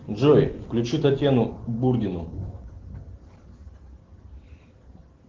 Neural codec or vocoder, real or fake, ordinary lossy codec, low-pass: none; real; Opus, 16 kbps; 7.2 kHz